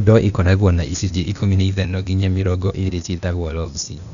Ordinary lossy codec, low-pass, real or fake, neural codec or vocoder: none; 7.2 kHz; fake; codec, 16 kHz, 0.8 kbps, ZipCodec